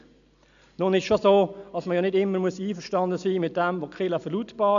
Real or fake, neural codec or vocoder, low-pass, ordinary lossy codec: real; none; 7.2 kHz; none